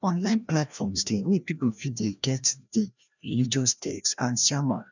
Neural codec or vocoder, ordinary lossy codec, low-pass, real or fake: codec, 16 kHz, 1 kbps, FreqCodec, larger model; none; 7.2 kHz; fake